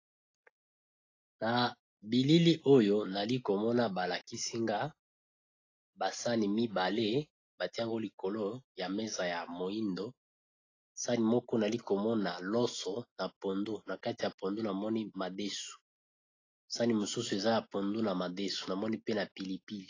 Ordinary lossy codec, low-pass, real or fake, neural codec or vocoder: AAC, 32 kbps; 7.2 kHz; real; none